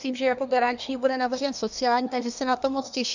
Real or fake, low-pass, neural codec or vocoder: fake; 7.2 kHz; codec, 24 kHz, 1 kbps, SNAC